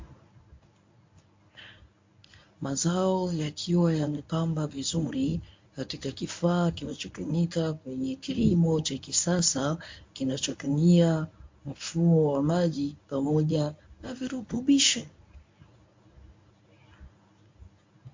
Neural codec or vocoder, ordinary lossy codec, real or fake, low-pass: codec, 24 kHz, 0.9 kbps, WavTokenizer, medium speech release version 1; MP3, 48 kbps; fake; 7.2 kHz